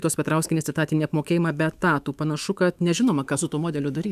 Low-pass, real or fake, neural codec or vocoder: 14.4 kHz; fake; autoencoder, 48 kHz, 128 numbers a frame, DAC-VAE, trained on Japanese speech